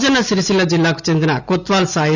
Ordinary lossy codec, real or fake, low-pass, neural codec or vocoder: none; real; 7.2 kHz; none